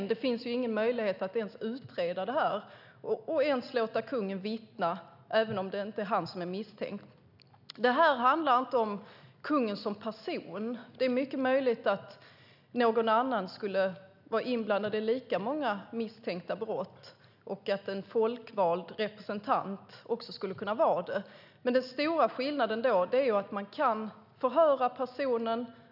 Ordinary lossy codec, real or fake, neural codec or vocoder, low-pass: none; real; none; 5.4 kHz